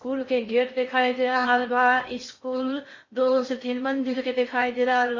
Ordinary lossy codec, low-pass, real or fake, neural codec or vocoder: MP3, 32 kbps; 7.2 kHz; fake; codec, 16 kHz in and 24 kHz out, 0.6 kbps, FocalCodec, streaming, 2048 codes